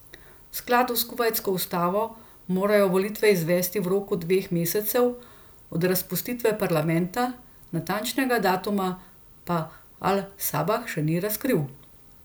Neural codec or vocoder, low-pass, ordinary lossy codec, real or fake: none; none; none; real